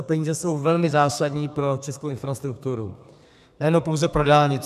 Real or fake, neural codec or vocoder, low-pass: fake; codec, 32 kHz, 1.9 kbps, SNAC; 14.4 kHz